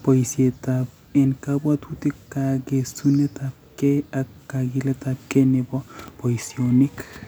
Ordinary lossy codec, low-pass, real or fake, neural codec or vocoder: none; none; real; none